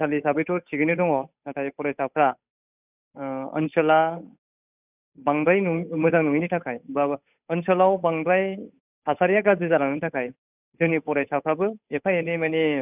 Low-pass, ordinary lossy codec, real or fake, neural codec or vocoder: 3.6 kHz; none; fake; codec, 16 kHz, 8 kbps, FunCodec, trained on Chinese and English, 25 frames a second